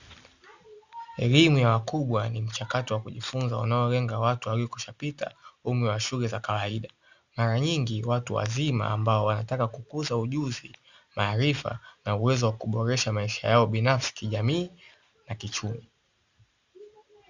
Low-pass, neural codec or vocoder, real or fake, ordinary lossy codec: 7.2 kHz; none; real; Opus, 64 kbps